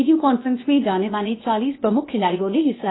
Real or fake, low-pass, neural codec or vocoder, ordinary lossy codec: fake; 7.2 kHz; codec, 16 kHz, 0.8 kbps, ZipCodec; AAC, 16 kbps